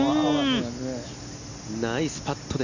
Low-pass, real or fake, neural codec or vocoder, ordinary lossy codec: 7.2 kHz; real; none; none